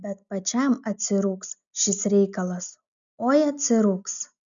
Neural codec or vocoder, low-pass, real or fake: none; 7.2 kHz; real